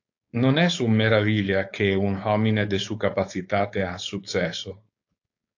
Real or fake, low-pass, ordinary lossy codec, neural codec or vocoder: fake; 7.2 kHz; AAC, 48 kbps; codec, 16 kHz, 4.8 kbps, FACodec